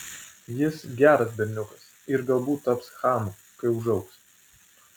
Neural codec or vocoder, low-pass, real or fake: none; 19.8 kHz; real